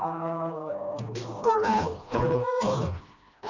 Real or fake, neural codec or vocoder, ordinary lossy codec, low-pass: fake; codec, 16 kHz, 1 kbps, FreqCodec, smaller model; none; 7.2 kHz